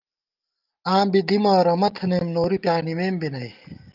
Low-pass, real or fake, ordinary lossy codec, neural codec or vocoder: 5.4 kHz; real; Opus, 24 kbps; none